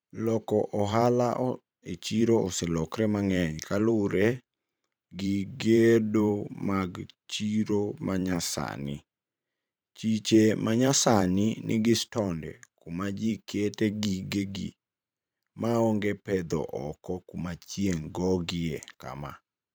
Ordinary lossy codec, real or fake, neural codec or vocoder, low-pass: none; fake; vocoder, 44.1 kHz, 128 mel bands every 512 samples, BigVGAN v2; none